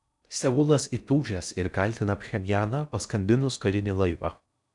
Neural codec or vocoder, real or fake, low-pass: codec, 16 kHz in and 24 kHz out, 0.6 kbps, FocalCodec, streaming, 4096 codes; fake; 10.8 kHz